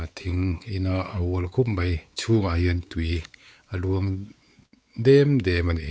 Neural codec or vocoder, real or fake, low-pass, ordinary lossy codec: codec, 16 kHz, 4 kbps, X-Codec, WavLM features, trained on Multilingual LibriSpeech; fake; none; none